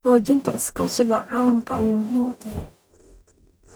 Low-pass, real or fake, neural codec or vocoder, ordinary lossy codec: none; fake; codec, 44.1 kHz, 0.9 kbps, DAC; none